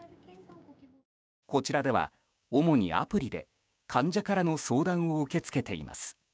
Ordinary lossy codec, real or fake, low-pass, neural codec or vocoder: none; fake; none; codec, 16 kHz, 6 kbps, DAC